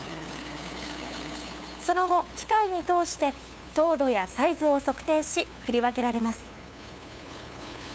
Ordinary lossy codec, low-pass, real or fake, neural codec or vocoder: none; none; fake; codec, 16 kHz, 2 kbps, FunCodec, trained on LibriTTS, 25 frames a second